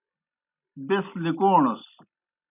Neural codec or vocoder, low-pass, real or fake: none; 3.6 kHz; real